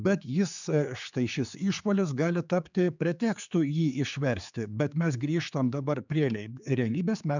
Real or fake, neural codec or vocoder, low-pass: fake; codec, 16 kHz, 4 kbps, X-Codec, HuBERT features, trained on general audio; 7.2 kHz